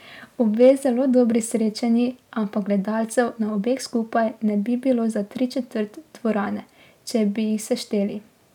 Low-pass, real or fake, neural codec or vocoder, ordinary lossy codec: 19.8 kHz; real; none; none